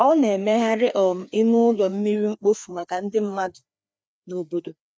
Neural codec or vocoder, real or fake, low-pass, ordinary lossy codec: codec, 16 kHz, 2 kbps, FreqCodec, larger model; fake; none; none